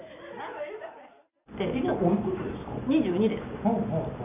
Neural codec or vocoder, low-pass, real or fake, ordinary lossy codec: none; 3.6 kHz; real; none